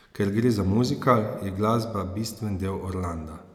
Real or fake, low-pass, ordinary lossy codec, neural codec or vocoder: real; 19.8 kHz; none; none